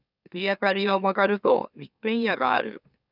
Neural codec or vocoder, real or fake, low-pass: autoencoder, 44.1 kHz, a latent of 192 numbers a frame, MeloTTS; fake; 5.4 kHz